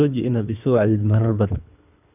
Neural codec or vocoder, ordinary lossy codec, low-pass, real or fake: codec, 24 kHz, 3 kbps, HILCodec; none; 3.6 kHz; fake